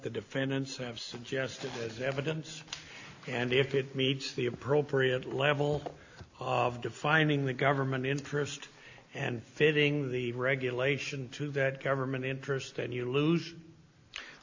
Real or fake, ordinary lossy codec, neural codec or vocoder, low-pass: real; AAC, 48 kbps; none; 7.2 kHz